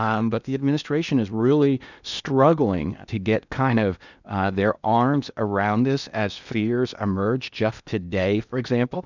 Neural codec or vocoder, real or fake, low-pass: codec, 16 kHz in and 24 kHz out, 0.8 kbps, FocalCodec, streaming, 65536 codes; fake; 7.2 kHz